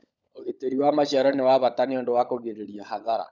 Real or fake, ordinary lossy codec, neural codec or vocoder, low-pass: fake; none; codec, 16 kHz, 16 kbps, FunCodec, trained on LibriTTS, 50 frames a second; 7.2 kHz